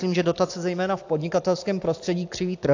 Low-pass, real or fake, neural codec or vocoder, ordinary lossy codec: 7.2 kHz; real; none; AAC, 48 kbps